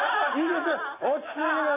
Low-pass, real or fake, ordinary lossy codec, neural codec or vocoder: 3.6 kHz; real; none; none